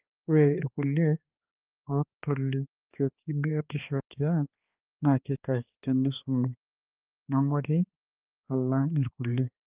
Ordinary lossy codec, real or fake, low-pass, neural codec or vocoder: Opus, 32 kbps; fake; 3.6 kHz; codec, 16 kHz, 2 kbps, X-Codec, HuBERT features, trained on balanced general audio